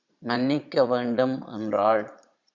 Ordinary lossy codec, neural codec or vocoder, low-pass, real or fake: Opus, 64 kbps; vocoder, 22.05 kHz, 80 mel bands, Vocos; 7.2 kHz; fake